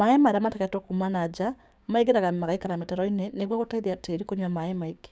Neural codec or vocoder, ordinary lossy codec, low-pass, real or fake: codec, 16 kHz, 6 kbps, DAC; none; none; fake